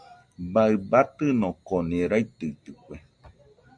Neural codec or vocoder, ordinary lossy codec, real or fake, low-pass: none; MP3, 64 kbps; real; 9.9 kHz